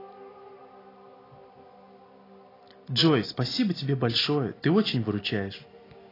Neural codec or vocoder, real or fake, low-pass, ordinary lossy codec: none; real; 5.4 kHz; AAC, 24 kbps